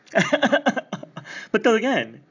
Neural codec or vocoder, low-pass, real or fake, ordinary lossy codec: none; 7.2 kHz; real; AAC, 48 kbps